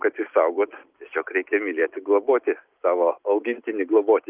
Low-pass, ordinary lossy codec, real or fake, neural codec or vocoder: 3.6 kHz; Opus, 32 kbps; real; none